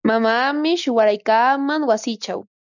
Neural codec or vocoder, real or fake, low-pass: none; real; 7.2 kHz